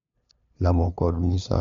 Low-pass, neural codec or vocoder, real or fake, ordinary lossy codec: 7.2 kHz; codec, 16 kHz, 2 kbps, FunCodec, trained on LibriTTS, 25 frames a second; fake; MP3, 48 kbps